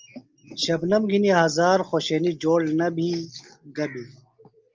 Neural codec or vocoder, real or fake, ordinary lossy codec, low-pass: none; real; Opus, 24 kbps; 7.2 kHz